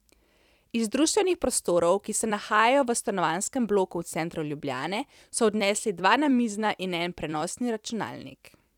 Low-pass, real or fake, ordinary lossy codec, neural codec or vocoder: 19.8 kHz; fake; none; vocoder, 44.1 kHz, 128 mel bands every 256 samples, BigVGAN v2